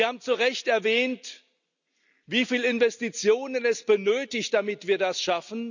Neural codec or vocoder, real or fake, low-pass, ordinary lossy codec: none; real; 7.2 kHz; none